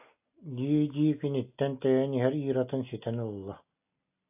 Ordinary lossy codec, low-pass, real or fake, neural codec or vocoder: AAC, 32 kbps; 3.6 kHz; real; none